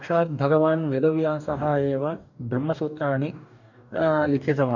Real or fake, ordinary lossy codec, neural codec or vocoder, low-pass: fake; none; codec, 44.1 kHz, 2.6 kbps, DAC; 7.2 kHz